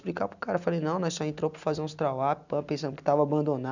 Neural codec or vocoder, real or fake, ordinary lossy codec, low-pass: none; real; none; 7.2 kHz